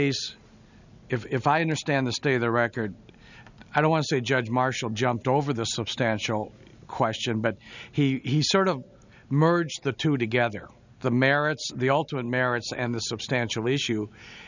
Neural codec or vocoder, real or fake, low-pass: none; real; 7.2 kHz